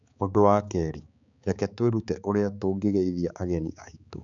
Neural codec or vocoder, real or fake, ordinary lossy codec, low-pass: codec, 16 kHz, 4 kbps, X-Codec, HuBERT features, trained on balanced general audio; fake; none; 7.2 kHz